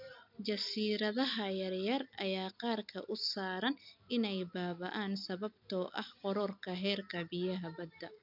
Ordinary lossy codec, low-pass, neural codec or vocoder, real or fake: none; 5.4 kHz; none; real